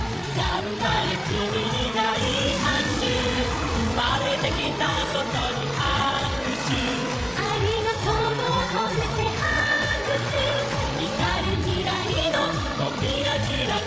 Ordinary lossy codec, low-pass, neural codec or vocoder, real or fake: none; none; codec, 16 kHz, 8 kbps, FreqCodec, larger model; fake